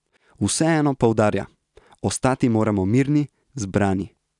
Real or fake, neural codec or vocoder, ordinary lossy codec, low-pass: real; none; none; 10.8 kHz